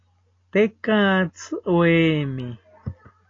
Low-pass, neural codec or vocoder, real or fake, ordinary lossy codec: 7.2 kHz; none; real; AAC, 32 kbps